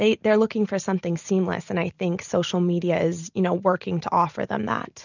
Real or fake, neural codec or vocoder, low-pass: real; none; 7.2 kHz